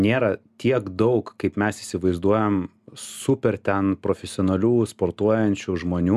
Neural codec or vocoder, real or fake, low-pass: none; real; 14.4 kHz